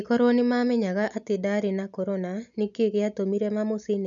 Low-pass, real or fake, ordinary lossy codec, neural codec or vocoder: 7.2 kHz; real; none; none